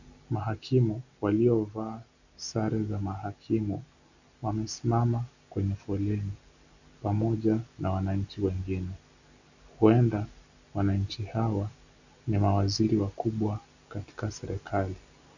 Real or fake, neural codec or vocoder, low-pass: real; none; 7.2 kHz